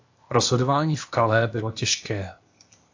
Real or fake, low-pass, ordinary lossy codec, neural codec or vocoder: fake; 7.2 kHz; AAC, 48 kbps; codec, 16 kHz, 0.8 kbps, ZipCodec